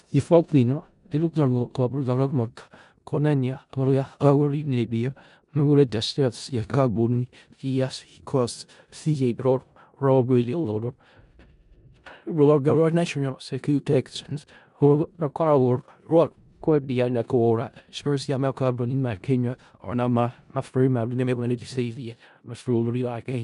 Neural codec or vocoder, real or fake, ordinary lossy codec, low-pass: codec, 16 kHz in and 24 kHz out, 0.4 kbps, LongCat-Audio-Codec, four codebook decoder; fake; none; 10.8 kHz